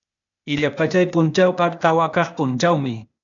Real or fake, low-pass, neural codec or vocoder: fake; 7.2 kHz; codec, 16 kHz, 0.8 kbps, ZipCodec